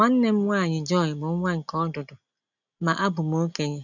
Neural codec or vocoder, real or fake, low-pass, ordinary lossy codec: none; real; 7.2 kHz; none